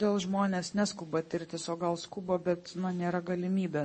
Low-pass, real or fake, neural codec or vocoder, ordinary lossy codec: 9.9 kHz; fake; codec, 44.1 kHz, 7.8 kbps, Pupu-Codec; MP3, 32 kbps